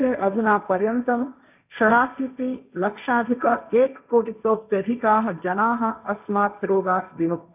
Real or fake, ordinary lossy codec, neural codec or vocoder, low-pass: fake; none; codec, 16 kHz, 1.1 kbps, Voila-Tokenizer; 3.6 kHz